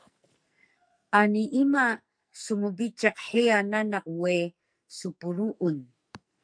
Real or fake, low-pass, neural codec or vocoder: fake; 9.9 kHz; codec, 44.1 kHz, 2.6 kbps, SNAC